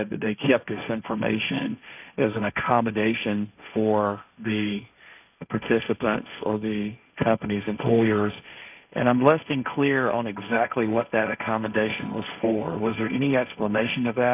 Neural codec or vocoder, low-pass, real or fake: codec, 16 kHz, 1.1 kbps, Voila-Tokenizer; 3.6 kHz; fake